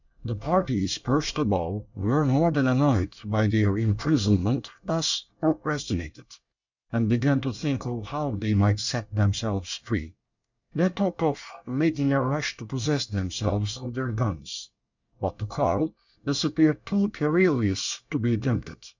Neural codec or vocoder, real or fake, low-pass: codec, 24 kHz, 1 kbps, SNAC; fake; 7.2 kHz